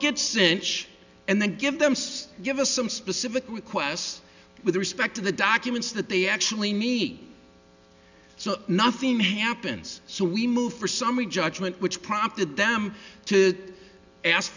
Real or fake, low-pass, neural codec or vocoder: real; 7.2 kHz; none